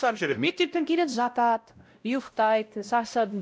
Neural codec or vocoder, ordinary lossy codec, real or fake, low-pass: codec, 16 kHz, 0.5 kbps, X-Codec, WavLM features, trained on Multilingual LibriSpeech; none; fake; none